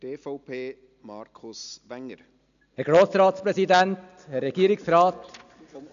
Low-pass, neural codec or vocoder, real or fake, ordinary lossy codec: 7.2 kHz; none; real; none